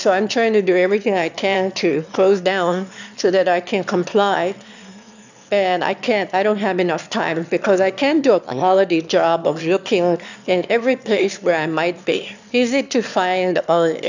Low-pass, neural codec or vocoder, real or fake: 7.2 kHz; autoencoder, 22.05 kHz, a latent of 192 numbers a frame, VITS, trained on one speaker; fake